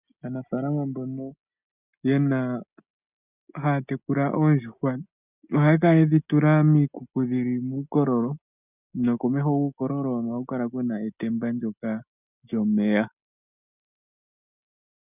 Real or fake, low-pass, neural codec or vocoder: real; 3.6 kHz; none